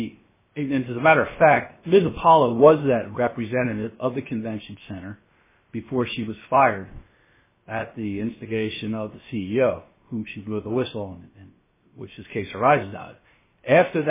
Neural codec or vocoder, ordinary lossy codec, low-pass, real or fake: codec, 16 kHz, about 1 kbps, DyCAST, with the encoder's durations; MP3, 16 kbps; 3.6 kHz; fake